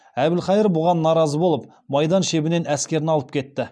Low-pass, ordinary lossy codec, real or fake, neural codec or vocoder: none; none; real; none